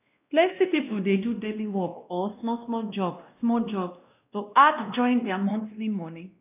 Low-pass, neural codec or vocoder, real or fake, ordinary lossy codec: 3.6 kHz; codec, 16 kHz, 1 kbps, X-Codec, WavLM features, trained on Multilingual LibriSpeech; fake; none